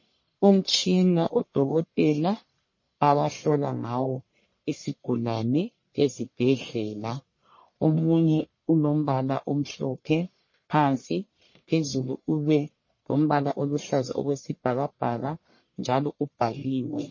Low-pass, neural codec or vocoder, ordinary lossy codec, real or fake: 7.2 kHz; codec, 44.1 kHz, 1.7 kbps, Pupu-Codec; MP3, 32 kbps; fake